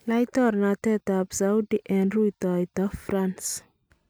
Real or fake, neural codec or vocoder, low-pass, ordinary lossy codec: real; none; none; none